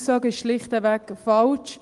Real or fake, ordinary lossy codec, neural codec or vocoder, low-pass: real; Opus, 24 kbps; none; 10.8 kHz